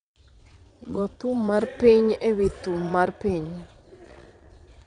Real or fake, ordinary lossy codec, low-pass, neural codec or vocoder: fake; none; 9.9 kHz; vocoder, 22.05 kHz, 80 mel bands, Vocos